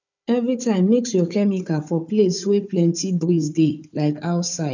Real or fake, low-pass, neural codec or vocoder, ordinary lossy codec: fake; 7.2 kHz; codec, 16 kHz, 4 kbps, FunCodec, trained on Chinese and English, 50 frames a second; none